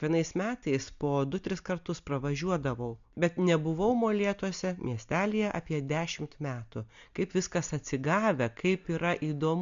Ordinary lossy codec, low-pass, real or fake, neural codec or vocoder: MP3, 64 kbps; 7.2 kHz; real; none